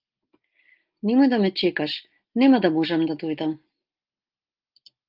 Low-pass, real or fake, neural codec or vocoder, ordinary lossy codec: 5.4 kHz; real; none; Opus, 32 kbps